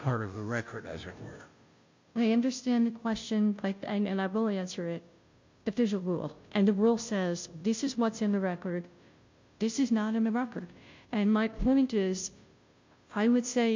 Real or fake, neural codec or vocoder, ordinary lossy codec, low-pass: fake; codec, 16 kHz, 0.5 kbps, FunCodec, trained on Chinese and English, 25 frames a second; MP3, 48 kbps; 7.2 kHz